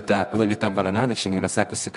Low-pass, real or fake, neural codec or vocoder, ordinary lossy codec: 10.8 kHz; fake; codec, 24 kHz, 0.9 kbps, WavTokenizer, medium music audio release; MP3, 96 kbps